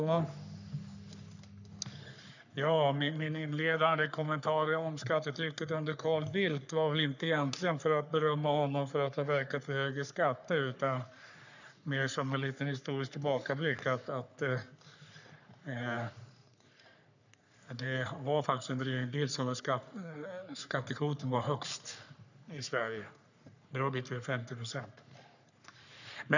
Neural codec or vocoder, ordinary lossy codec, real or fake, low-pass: codec, 44.1 kHz, 3.4 kbps, Pupu-Codec; none; fake; 7.2 kHz